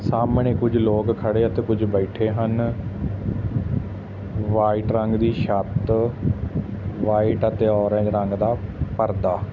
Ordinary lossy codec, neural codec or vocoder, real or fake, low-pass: none; none; real; 7.2 kHz